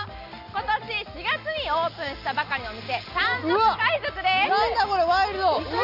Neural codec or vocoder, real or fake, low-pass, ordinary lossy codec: none; real; 5.4 kHz; none